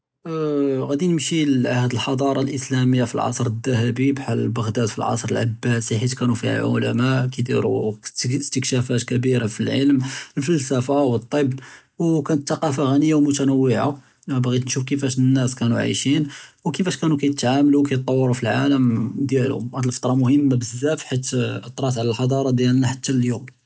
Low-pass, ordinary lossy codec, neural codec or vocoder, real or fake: none; none; none; real